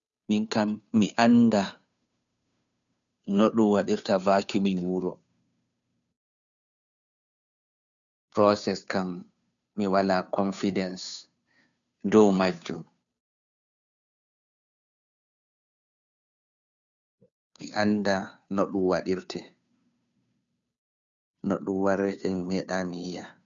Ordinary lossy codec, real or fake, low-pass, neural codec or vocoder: none; fake; 7.2 kHz; codec, 16 kHz, 2 kbps, FunCodec, trained on Chinese and English, 25 frames a second